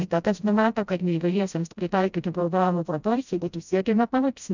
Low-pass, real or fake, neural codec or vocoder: 7.2 kHz; fake; codec, 16 kHz, 0.5 kbps, FreqCodec, smaller model